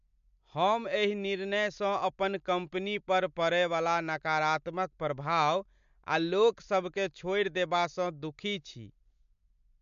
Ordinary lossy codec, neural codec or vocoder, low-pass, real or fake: MP3, 64 kbps; none; 7.2 kHz; real